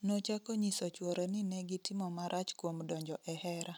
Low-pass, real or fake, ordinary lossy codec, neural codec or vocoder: none; real; none; none